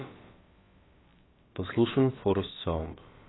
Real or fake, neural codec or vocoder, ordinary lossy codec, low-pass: fake; codec, 16 kHz, about 1 kbps, DyCAST, with the encoder's durations; AAC, 16 kbps; 7.2 kHz